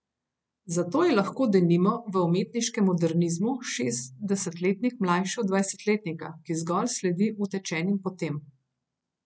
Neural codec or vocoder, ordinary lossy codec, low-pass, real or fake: none; none; none; real